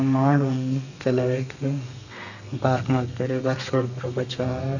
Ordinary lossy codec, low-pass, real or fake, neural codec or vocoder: none; 7.2 kHz; fake; codec, 32 kHz, 1.9 kbps, SNAC